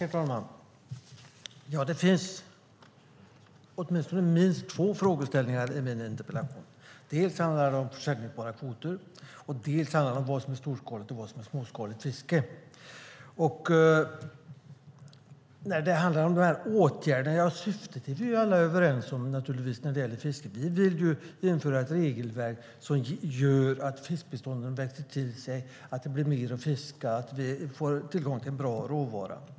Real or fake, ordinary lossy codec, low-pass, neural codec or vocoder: real; none; none; none